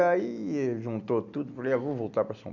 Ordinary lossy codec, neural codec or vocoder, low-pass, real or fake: none; none; 7.2 kHz; real